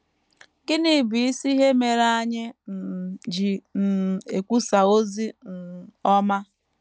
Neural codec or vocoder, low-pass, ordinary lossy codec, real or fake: none; none; none; real